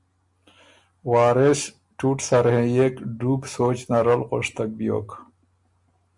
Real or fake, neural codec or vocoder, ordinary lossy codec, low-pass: real; none; MP3, 64 kbps; 10.8 kHz